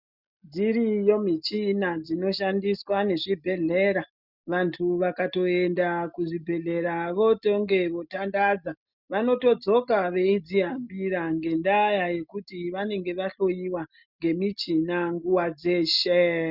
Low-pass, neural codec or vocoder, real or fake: 5.4 kHz; none; real